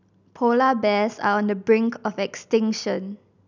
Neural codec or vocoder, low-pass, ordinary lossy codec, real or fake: none; 7.2 kHz; none; real